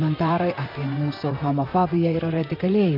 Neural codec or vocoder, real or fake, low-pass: vocoder, 44.1 kHz, 128 mel bands, Pupu-Vocoder; fake; 5.4 kHz